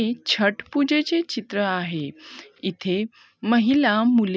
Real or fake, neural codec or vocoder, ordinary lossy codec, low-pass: real; none; none; none